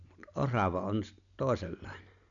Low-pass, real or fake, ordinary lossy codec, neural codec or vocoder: 7.2 kHz; real; none; none